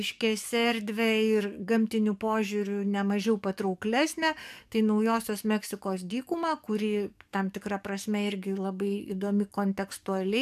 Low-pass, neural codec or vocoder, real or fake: 14.4 kHz; codec, 44.1 kHz, 7.8 kbps, DAC; fake